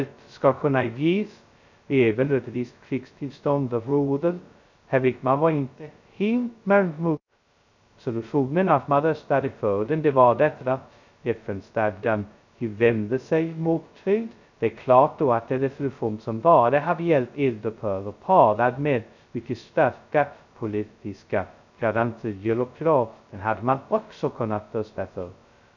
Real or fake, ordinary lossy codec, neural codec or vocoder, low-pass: fake; none; codec, 16 kHz, 0.2 kbps, FocalCodec; 7.2 kHz